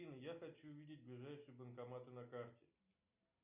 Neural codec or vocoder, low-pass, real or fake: none; 3.6 kHz; real